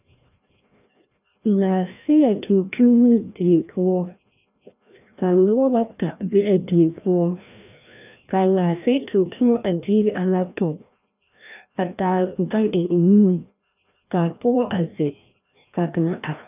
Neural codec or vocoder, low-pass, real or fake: codec, 16 kHz, 1 kbps, FreqCodec, larger model; 3.6 kHz; fake